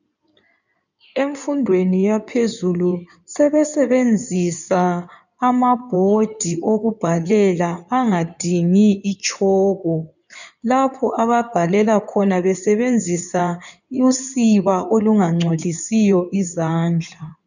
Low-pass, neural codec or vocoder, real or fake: 7.2 kHz; codec, 16 kHz in and 24 kHz out, 2.2 kbps, FireRedTTS-2 codec; fake